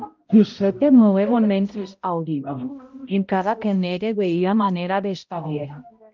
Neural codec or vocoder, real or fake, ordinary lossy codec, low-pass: codec, 16 kHz, 0.5 kbps, X-Codec, HuBERT features, trained on balanced general audio; fake; Opus, 32 kbps; 7.2 kHz